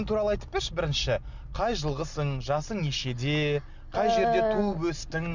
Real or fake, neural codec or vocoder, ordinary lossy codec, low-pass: real; none; none; 7.2 kHz